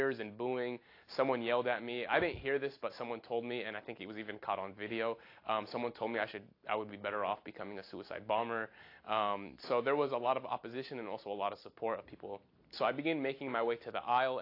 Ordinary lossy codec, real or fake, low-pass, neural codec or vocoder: AAC, 32 kbps; real; 5.4 kHz; none